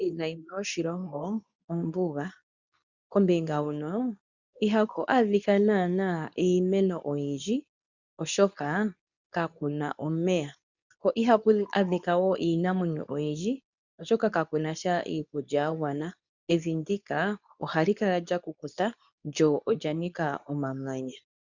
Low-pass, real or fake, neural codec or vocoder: 7.2 kHz; fake; codec, 24 kHz, 0.9 kbps, WavTokenizer, medium speech release version 2